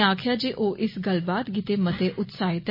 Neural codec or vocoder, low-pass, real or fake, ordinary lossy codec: none; 5.4 kHz; real; MP3, 24 kbps